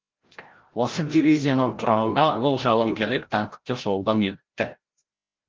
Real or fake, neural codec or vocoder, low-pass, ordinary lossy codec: fake; codec, 16 kHz, 0.5 kbps, FreqCodec, larger model; 7.2 kHz; Opus, 16 kbps